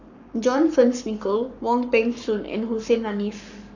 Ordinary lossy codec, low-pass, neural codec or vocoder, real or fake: none; 7.2 kHz; codec, 44.1 kHz, 7.8 kbps, Pupu-Codec; fake